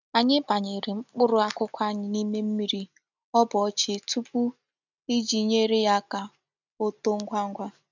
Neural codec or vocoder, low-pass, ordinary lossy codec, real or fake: none; 7.2 kHz; none; real